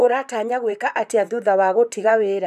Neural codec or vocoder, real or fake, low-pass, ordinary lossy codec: vocoder, 44.1 kHz, 128 mel bands, Pupu-Vocoder; fake; 14.4 kHz; none